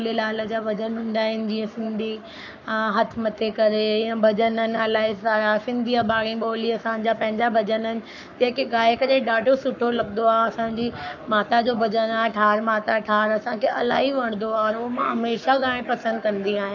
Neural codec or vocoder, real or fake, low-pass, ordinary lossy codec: codec, 44.1 kHz, 7.8 kbps, Pupu-Codec; fake; 7.2 kHz; none